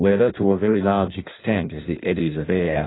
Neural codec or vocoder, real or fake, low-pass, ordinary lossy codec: codec, 16 kHz in and 24 kHz out, 0.6 kbps, FireRedTTS-2 codec; fake; 7.2 kHz; AAC, 16 kbps